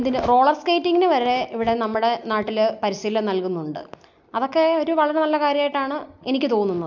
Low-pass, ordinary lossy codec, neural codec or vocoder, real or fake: 7.2 kHz; none; none; real